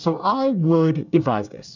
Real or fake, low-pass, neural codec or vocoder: fake; 7.2 kHz; codec, 24 kHz, 1 kbps, SNAC